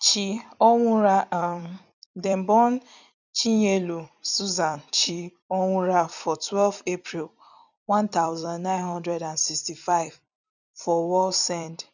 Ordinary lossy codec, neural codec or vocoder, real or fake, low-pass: none; vocoder, 44.1 kHz, 128 mel bands every 256 samples, BigVGAN v2; fake; 7.2 kHz